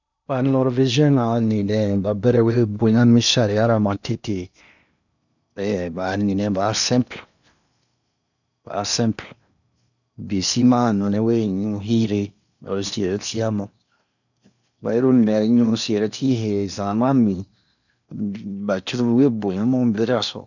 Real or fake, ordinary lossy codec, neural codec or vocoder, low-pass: fake; none; codec, 16 kHz in and 24 kHz out, 0.8 kbps, FocalCodec, streaming, 65536 codes; 7.2 kHz